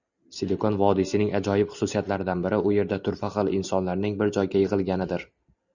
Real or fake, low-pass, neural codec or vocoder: real; 7.2 kHz; none